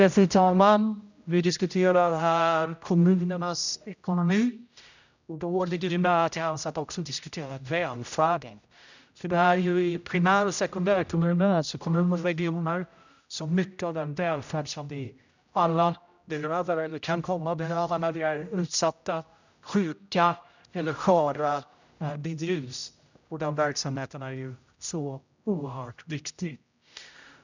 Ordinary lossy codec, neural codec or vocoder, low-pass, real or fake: none; codec, 16 kHz, 0.5 kbps, X-Codec, HuBERT features, trained on general audio; 7.2 kHz; fake